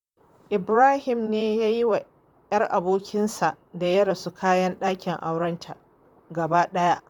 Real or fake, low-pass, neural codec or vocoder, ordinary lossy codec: fake; 19.8 kHz; vocoder, 44.1 kHz, 128 mel bands, Pupu-Vocoder; none